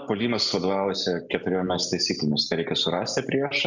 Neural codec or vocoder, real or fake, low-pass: none; real; 7.2 kHz